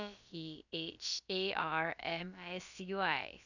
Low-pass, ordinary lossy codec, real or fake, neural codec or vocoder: 7.2 kHz; none; fake; codec, 16 kHz, about 1 kbps, DyCAST, with the encoder's durations